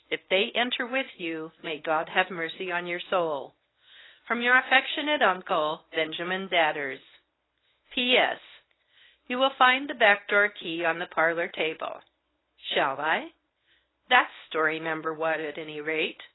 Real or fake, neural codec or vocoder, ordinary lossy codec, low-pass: fake; codec, 24 kHz, 0.9 kbps, WavTokenizer, medium speech release version 1; AAC, 16 kbps; 7.2 kHz